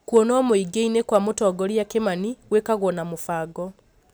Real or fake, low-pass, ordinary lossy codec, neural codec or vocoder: real; none; none; none